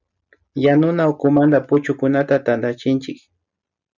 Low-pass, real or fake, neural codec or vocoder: 7.2 kHz; real; none